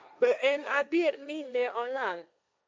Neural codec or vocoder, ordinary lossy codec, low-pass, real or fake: codec, 16 kHz, 1.1 kbps, Voila-Tokenizer; none; none; fake